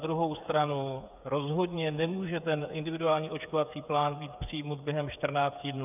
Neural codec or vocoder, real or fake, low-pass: codec, 16 kHz, 8 kbps, FreqCodec, smaller model; fake; 3.6 kHz